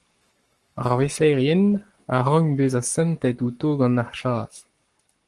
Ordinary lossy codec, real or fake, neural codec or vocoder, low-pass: Opus, 24 kbps; fake; codec, 44.1 kHz, 7.8 kbps, Pupu-Codec; 10.8 kHz